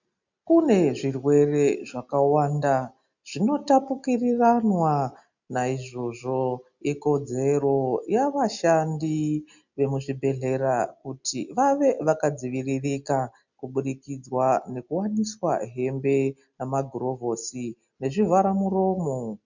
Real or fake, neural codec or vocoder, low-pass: real; none; 7.2 kHz